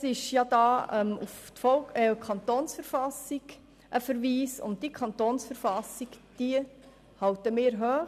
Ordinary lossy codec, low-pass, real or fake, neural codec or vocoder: none; 14.4 kHz; real; none